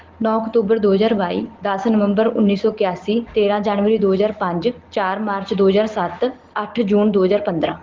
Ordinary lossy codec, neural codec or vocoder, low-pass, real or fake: Opus, 16 kbps; none; 7.2 kHz; real